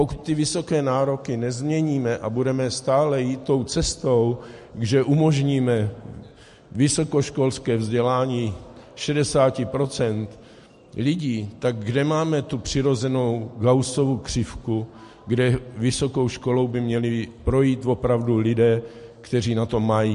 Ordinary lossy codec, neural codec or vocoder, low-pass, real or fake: MP3, 48 kbps; none; 14.4 kHz; real